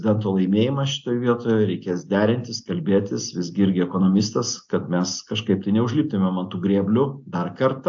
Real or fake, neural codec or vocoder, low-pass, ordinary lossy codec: real; none; 7.2 kHz; AAC, 64 kbps